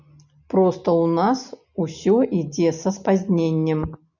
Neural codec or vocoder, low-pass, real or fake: none; 7.2 kHz; real